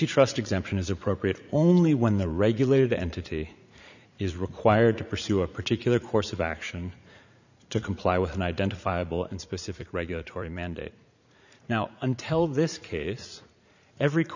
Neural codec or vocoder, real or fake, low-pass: vocoder, 44.1 kHz, 80 mel bands, Vocos; fake; 7.2 kHz